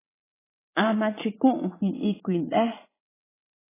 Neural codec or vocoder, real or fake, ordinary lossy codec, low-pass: vocoder, 22.05 kHz, 80 mel bands, Vocos; fake; AAC, 16 kbps; 3.6 kHz